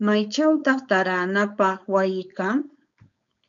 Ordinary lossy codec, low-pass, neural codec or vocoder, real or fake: MP3, 96 kbps; 7.2 kHz; codec, 16 kHz, 4.8 kbps, FACodec; fake